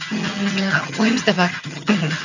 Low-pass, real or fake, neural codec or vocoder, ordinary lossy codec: 7.2 kHz; fake; vocoder, 22.05 kHz, 80 mel bands, HiFi-GAN; none